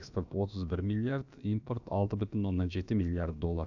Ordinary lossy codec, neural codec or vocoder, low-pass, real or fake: none; codec, 16 kHz, about 1 kbps, DyCAST, with the encoder's durations; 7.2 kHz; fake